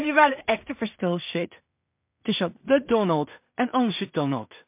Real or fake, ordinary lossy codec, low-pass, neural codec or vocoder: fake; MP3, 32 kbps; 3.6 kHz; codec, 16 kHz in and 24 kHz out, 0.4 kbps, LongCat-Audio-Codec, two codebook decoder